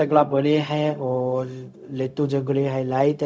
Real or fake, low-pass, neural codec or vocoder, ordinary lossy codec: fake; none; codec, 16 kHz, 0.4 kbps, LongCat-Audio-Codec; none